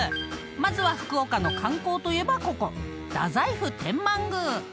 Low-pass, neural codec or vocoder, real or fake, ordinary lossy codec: none; none; real; none